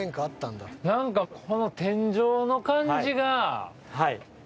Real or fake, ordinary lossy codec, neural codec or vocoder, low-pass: real; none; none; none